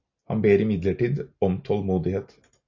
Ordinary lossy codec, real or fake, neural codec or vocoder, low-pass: AAC, 48 kbps; real; none; 7.2 kHz